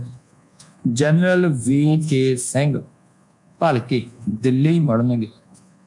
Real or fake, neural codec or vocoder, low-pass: fake; codec, 24 kHz, 1.2 kbps, DualCodec; 10.8 kHz